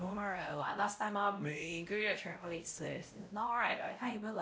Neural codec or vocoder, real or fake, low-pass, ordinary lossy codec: codec, 16 kHz, 0.5 kbps, X-Codec, WavLM features, trained on Multilingual LibriSpeech; fake; none; none